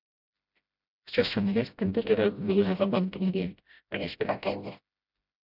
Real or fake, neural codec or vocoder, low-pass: fake; codec, 16 kHz, 0.5 kbps, FreqCodec, smaller model; 5.4 kHz